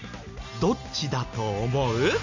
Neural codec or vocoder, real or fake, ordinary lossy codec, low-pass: none; real; none; 7.2 kHz